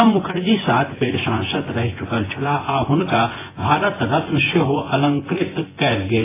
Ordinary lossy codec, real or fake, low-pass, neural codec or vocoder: AAC, 16 kbps; fake; 3.6 kHz; vocoder, 24 kHz, 100 mel bands, Vocos